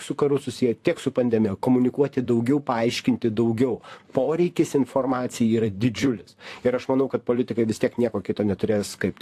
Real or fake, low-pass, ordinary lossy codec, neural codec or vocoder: fake; 14.4 kHz; AAC, 64 kbps; vocoder, 44.1 kHz, 128 mel bands, Pupu-Vocoder